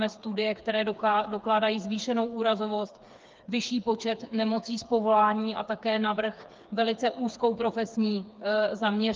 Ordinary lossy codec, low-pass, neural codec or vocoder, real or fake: Opus, 24 kbps; 7.2 kHz; codec, 16 kHz, 4 kbps, FreqCodec, smaller model; fake